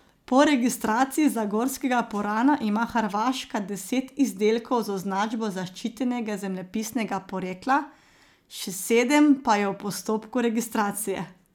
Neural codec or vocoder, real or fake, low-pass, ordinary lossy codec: none; real; 19.8 kHz; none